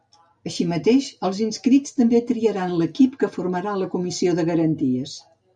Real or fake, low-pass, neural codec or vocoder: real; 9.9 kHz; none